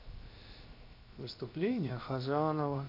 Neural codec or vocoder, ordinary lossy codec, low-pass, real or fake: codec, 16 kHz, 0.7 kbps, FocalCodec; AAC, 32 kbps; 5.4 kHz; fake